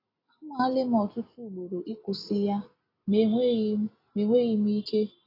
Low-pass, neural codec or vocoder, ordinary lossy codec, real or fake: 5.4 kHz; none; AAC, 24 kbps; real